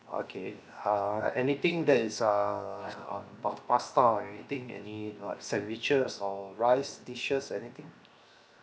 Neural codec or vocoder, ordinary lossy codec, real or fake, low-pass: codec, 16 kHz, 0.7 kbps, FocalCodec; none; fake; none